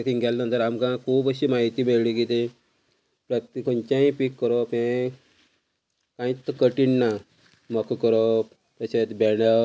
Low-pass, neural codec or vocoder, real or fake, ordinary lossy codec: none; none; real; none